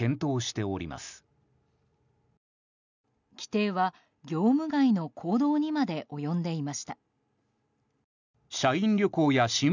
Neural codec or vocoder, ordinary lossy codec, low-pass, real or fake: none; none; 7.2 kHz; real